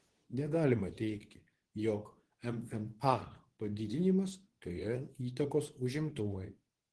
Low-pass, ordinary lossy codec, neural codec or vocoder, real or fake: 10.8 kHz; Opus, 16 kbps; codec, 24 kHz, 0.9 kbps, WavTokenizer, medium speech release version 2; fake